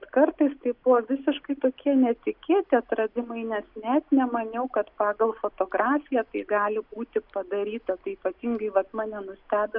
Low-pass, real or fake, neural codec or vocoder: 5.4 kHz; real; none